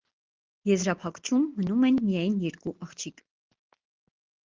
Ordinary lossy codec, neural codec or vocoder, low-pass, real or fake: Opus, 16 kbps; none; 7.2 kHz; real